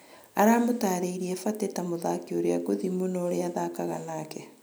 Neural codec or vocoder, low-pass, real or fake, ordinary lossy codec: none; none; real; none